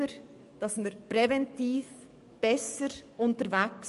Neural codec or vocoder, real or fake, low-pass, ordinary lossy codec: codec, 44.1 kHz, 7.8 kbps, DAC; fake; 14.4 kHz; MP3, 48 kbps